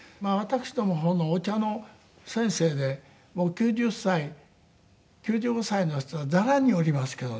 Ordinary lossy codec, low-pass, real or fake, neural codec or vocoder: none; none; real; none